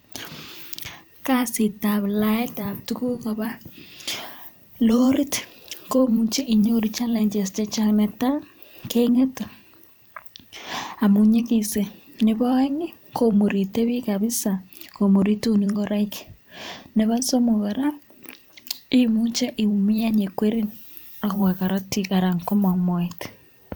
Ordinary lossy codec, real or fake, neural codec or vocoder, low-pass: none; fake; vocoder, 44.1 kHz, 128 mel bands every 512 samples, BigVGAN v2; none